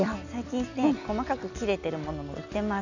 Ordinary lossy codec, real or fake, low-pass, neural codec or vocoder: none; real; 7.2 kHz; none